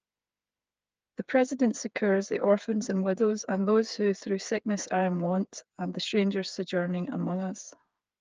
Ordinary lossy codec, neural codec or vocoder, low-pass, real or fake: Opus, 24 kbps; codec, 16 kHz, 4 kbps, FreqCodec, smaller model; 7.2 kHz; fake